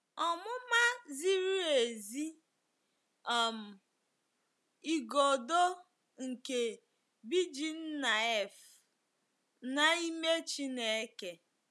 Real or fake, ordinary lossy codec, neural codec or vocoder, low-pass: real; none; none; none